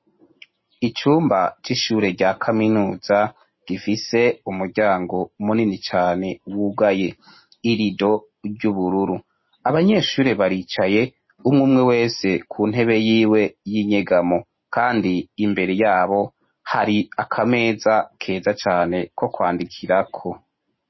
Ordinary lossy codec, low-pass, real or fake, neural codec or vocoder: MP3, 24 kbps; 7.2 kHz; real; none